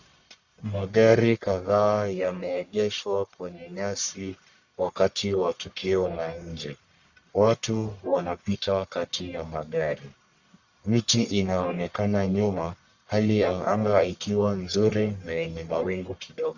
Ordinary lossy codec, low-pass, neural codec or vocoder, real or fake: Opus, 64 kbps; 7.2 kHz; codec, 44.1 kHz, 1.7 kbps, Pupu-Codec; fake